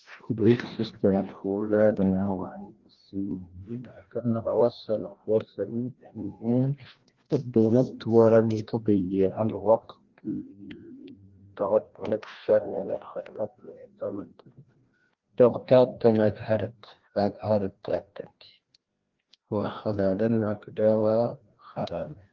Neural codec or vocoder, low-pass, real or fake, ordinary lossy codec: codec, 16 kHz, 1 kbps, FreqCodec, larger model; 7.2 kHz; fake; Opus, 16 kbps